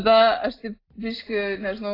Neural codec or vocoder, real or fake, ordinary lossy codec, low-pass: none; real; AAC, 24 kbps; 5.4 kHz